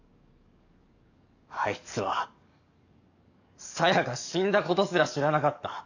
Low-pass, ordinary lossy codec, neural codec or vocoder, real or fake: 7.2 kHz; none; codec, 44.1 kHz, 7.8 kbps, DAC; fake